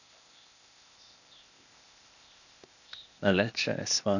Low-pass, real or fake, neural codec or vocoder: 7.2 kHz; fake; codec, 16 kHz, 0.8 kbps, ZipCodec